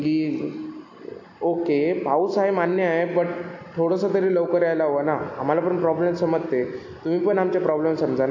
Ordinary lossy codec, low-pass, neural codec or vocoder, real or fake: MP3, 64 kbps; 7.2 kHz; none; real